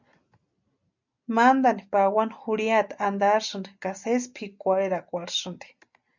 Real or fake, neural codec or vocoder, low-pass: real; none; 7.2 kHz